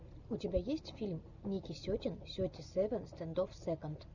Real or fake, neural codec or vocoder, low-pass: real; none; 7.2 kHz